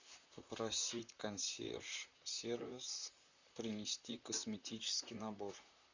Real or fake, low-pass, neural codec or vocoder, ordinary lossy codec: fake; 7.2 kHz; vocoder, 44.1 kHz, 128 mel bands, Pupu-Vocoder; Opus, 64 kbps